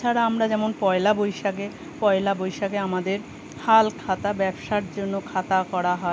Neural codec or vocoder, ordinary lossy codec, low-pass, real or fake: none; none; none; real